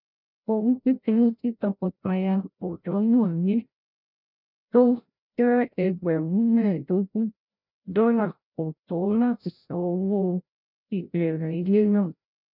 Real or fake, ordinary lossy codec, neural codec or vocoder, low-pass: fake; none; codec, 16 kHz, 0.5 kbps, FreqCodec, larger model; 5.4 kHz